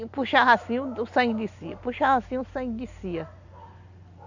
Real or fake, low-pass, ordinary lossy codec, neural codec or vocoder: real; 7.2 kHz; none; none